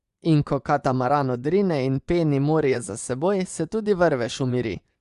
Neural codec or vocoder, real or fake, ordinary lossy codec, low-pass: vocoder, 24 kHz, 100 mel bands, Vocos; fake; Opus, 64 kbps; 10.8 kHz